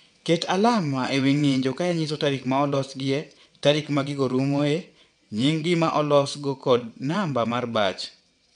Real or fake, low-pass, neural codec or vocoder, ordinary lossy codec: fake; 9.9 kHz; vocoder, 22.05 kHz, 80 mel bands, WaveNeXt; none